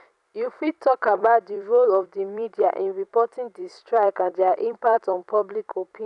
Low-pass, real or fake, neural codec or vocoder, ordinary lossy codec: 10.8 kHz; fake; vocoder, 44.1 kHz, 128 mel bands every 256 samples, BigVGAN v2; none